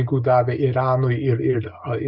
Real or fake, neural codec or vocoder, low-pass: real; none; 5.4 kHz